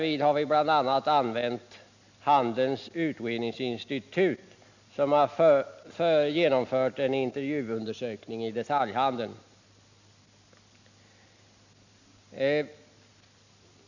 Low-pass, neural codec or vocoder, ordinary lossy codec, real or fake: 7.2 kHz; none; none; real